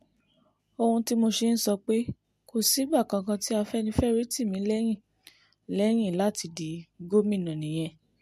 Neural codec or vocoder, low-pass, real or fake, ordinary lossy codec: none; 14.4 kHz; real; MP3, 64 kbps